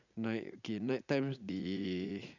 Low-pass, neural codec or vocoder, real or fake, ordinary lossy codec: 7.2 kHz; vocoder, 44.1 kHz, 80 mel bands, Vocos; fake; none